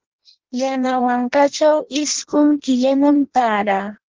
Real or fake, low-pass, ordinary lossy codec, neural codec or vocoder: fake; 7.2 kHz; Opus, 16 kbps; codec, 16 kHz in and 24 kHz out, 0.6 kbps, FireRedTTS-2 codec